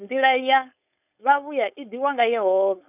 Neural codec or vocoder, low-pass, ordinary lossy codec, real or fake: codec, 16 kHz, 4.8 kbps, FACodec; 3.6 kHz; none; fake